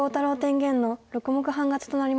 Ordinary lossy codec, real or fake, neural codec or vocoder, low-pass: none; real; none; none